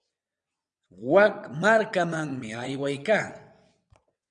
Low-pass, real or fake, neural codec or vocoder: 9.9 kHz; fake; vocoder, 22.05 kHz, 80 mel bands, WaveNeXt